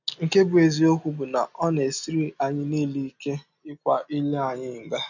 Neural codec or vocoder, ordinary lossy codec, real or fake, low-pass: none; none; real; 7.2 kHz